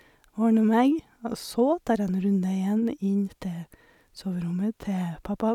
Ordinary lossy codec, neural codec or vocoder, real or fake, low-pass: none; none; real; 19.8 kHz